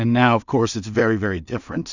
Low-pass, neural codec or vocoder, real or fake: 7.2 kHz; codec, 16 kHz in and 24 kHz out, 0.4 kbps, LongCat-Audio-Codec, two codebook decoder; fake